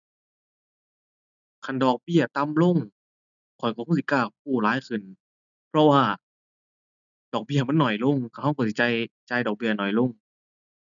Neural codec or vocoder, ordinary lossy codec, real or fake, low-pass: none; none; real; 7.2 kHz